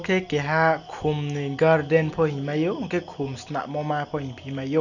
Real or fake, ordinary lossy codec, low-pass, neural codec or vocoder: real; none; 7.2 kHz; none